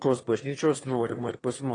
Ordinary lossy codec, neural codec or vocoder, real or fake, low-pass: AAC, 32 kbps; autoencoder, 22.05 kHz, a latent of 192 numbers a frame, VITS, trained on one speaker; fake; 9.9 kHz